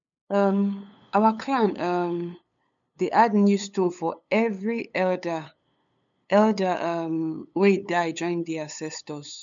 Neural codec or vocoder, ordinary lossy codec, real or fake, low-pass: codec, 16 kHz, 8 kbps, FunCodec, trained on LibriTTS, 25 frames a second; none; fake; 7.2 kHz